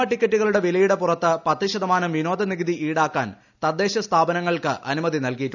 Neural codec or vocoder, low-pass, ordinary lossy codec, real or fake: none; 7.2 kHz; none; real